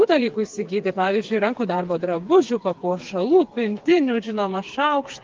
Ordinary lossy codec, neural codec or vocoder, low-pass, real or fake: Opus, 32 kbps; codec, 16 kHz, 4 kbps, FreqCodec, smaller model; 7.2 kHz; fake